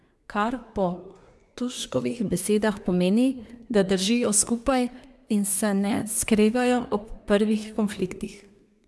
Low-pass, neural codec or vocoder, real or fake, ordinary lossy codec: none; codec, 24 kHz, 1 kbps, SNAC; fake; none